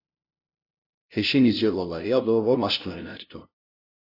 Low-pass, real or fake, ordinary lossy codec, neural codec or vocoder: 5.4 kHz; fake; AAC, 48 kbps; codec, 16 kHz, 0.5 kbps, FunCodec, trained on LibriTTS, 25 frames a second